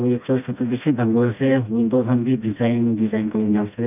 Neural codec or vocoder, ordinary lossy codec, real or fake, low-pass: codec, 16 kHz, 1 kbps, FreqCodec, smaller model; none; fake; 3.6 kHz